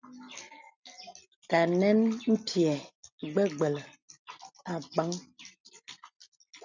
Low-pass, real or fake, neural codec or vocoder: 7.2 kHz; real; none